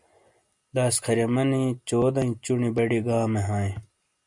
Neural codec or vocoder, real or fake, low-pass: none; real; 10.8 kHz